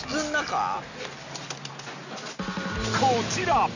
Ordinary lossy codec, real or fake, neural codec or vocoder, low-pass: none; real; none; 7.2 kHz